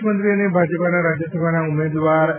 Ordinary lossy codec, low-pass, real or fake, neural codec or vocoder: none; 3.6 kHz; real; none